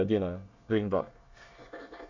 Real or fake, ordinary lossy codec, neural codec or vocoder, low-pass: fake; none; codec, 24 kHz, 1 kbps, SNAC; 7.2 kHz